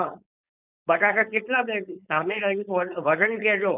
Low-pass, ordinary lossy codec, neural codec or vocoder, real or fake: 3.6 kHz; none; codec, 16 kHz, 4.8 kbps, FACodec; fake